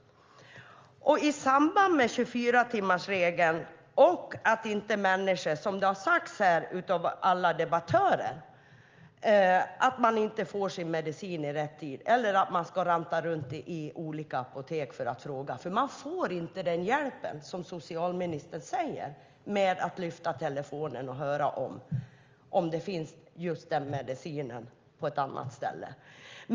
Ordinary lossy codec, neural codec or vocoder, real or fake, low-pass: Opus, 32 kbps; none; real; 7.2 kHz